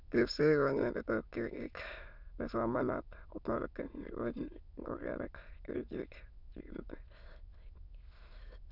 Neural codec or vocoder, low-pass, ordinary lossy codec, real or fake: autoencoder, 22.05 kHz, a latent of 192 numbers a frame, VITS, trained on many speakers; 5.4 kHz; none; fake